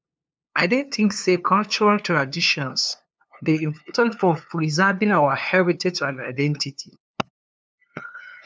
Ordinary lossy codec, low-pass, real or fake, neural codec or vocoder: none; none; fake; codec, 16 kHz, 2 kbps, FunCodec, trained on LibriTTS, 25 frames a second